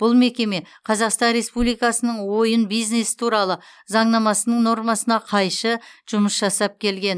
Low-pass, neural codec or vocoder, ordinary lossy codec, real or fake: none; none; none; real